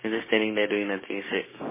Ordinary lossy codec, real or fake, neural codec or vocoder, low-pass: MP3, 16 kbps; fake; codec, 16 kHz, 6 kbps, DAC; 3.6 kHz